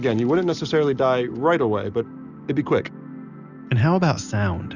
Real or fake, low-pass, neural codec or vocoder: real; 7.2 kHz; none